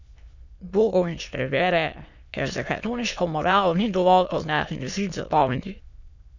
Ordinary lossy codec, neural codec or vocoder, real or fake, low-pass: none; autoencoder, 22.05 kHz, a latent of 192 numbers a frame, VITS, trained on many speakers; fake; 7.2 kHz